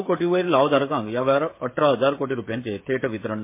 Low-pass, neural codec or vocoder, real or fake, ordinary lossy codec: 3.6 kHz; codec, 16 kHz, 16 kbps, FreqCodec, smaller model; fake; MP3, 24 kbps